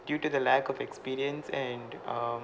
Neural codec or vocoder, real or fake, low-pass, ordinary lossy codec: none; real; none; none